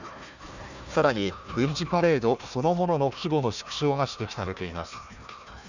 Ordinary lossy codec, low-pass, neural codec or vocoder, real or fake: none; 7.2 kHz; codec, 16 kHz, 1 kbps, FunCodec, trained on Chinese and English, 50 frames a second; fake